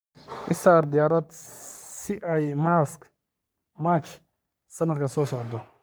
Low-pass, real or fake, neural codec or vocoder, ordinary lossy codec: none; fake; codec, 44.1 kHz, 3.4 kbps, Pupu-Codec; none